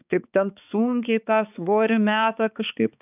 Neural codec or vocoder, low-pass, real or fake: codec, 16 kHz, 4 kbps, X-Codec, HuBERT features, trained on balanced general audio; 3.6 kHz; fake